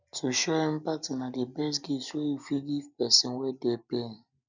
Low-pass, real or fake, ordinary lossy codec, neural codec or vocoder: 7.2 kHz; real; none; none